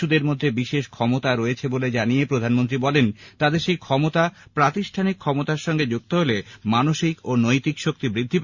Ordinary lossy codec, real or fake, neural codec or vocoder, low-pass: Opus, 64 kbps; real; none; 7.2 kHz